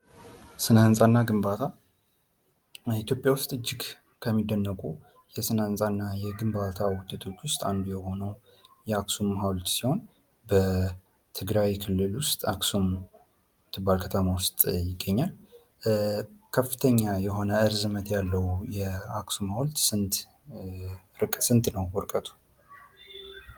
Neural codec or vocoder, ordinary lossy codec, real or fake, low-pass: none; Opus, 32 kbps; real; 19.8 kHz